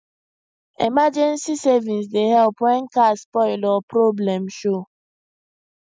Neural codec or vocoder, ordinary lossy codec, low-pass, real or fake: none; none; none; real